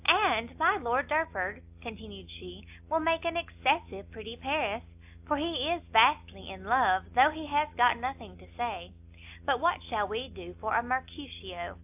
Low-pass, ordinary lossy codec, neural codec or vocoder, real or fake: 3.6 kHz; AAC, 32 kbps; none; real